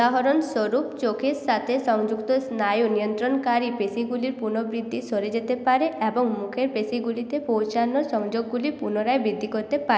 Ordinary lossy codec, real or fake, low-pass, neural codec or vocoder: none; real; none; none